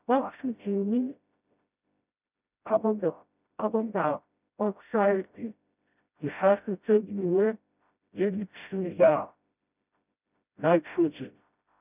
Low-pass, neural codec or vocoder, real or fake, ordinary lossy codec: 3.6 kHz; codec, 16 kHz, 0.5 kbps, FreqCodec, smaller model; fake; none